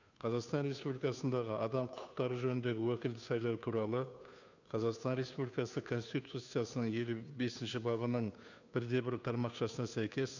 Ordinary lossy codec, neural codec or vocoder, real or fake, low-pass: none; codec, 16 kHz, 2 kbps, FunCodec, trained on Chinese and English, 25 frames a second; fake; 7.2 kHz